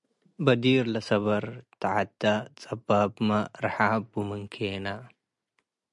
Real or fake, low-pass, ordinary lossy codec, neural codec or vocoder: real; 10.8 kHz; MP3, 96 kbps; none